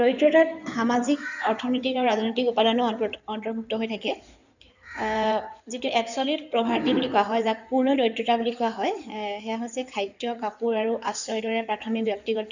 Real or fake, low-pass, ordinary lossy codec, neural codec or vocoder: fake; 7.2 kHz; none; codec, 16 kHz in and 24 kHz out, 2.2 kbps, FireRedTTS-2 codec